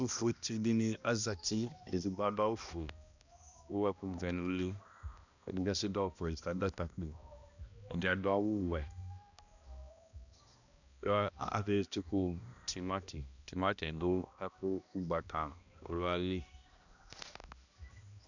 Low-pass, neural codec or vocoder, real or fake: 7.2 kHz; codec, 16 kHz, 1 kbps, X-Codec, HuBERT features, trained on balanced general audio; fake